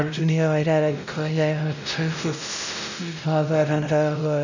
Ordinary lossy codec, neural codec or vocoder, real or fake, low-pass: none; codec, 16 kHz, 0.5 kbps, X-Codec, WavLM features, trained on Multilingual LibriSpeech; fake; 7.2 kHz